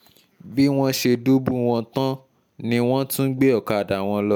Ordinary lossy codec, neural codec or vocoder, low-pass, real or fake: none; none; none; real